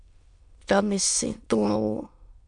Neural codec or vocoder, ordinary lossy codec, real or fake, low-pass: autoencoder, 22.05 kHz, a latent of 192 numbers a frame, VITS, trained on many speakers; MP3, 64 kbps; fake; 9.9 kHz